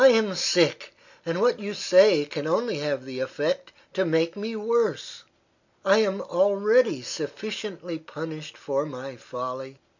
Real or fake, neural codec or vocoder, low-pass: real; none; 7.2 kHz